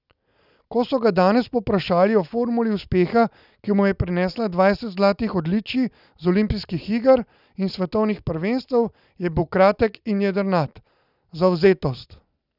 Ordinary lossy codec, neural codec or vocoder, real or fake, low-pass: none; none; real; 5.4 kHz